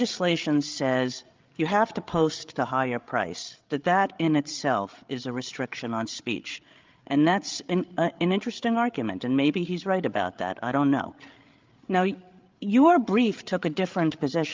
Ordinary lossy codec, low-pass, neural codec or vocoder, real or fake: Opus, 32 kbps; 7.2 kHz; codec, 16 kHz, 8 kbps, FreqCodec, larger model; fake